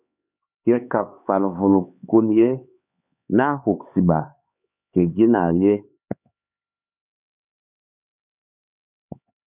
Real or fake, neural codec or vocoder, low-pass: fake; codec, 16 kHz, 4 kbps, X-Codec, HuBERT features, trained on LibriSpeech; 3.6 kHz